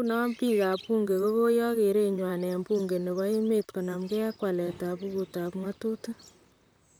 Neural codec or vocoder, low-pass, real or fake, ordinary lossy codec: vocoder, 44.1 kHz, 128 mel bands, Pupu-Vocoder; none; fake; none